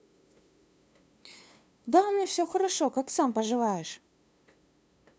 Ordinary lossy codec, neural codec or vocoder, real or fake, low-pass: none; codec, 16 kHz, 2 kbps, FunCodec, trained on LibriTTS, 25 frames a second; fake; none